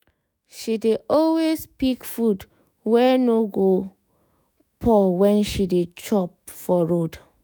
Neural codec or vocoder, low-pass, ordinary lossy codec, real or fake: autoencoder, 48 kHz, 128 numbers a frame, DAC-VAE, trained on Japanese speech; none; none; fake